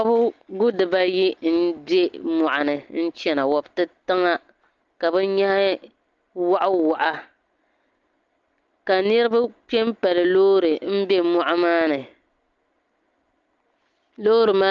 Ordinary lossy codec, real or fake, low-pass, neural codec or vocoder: Opus, 24 kbps; real; 7.2 kHz; none